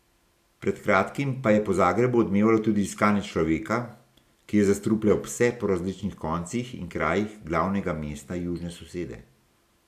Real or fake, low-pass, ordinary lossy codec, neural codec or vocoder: real; 14.4 kHz; none; none